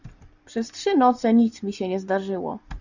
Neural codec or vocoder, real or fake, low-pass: none; real; 7.2 kHz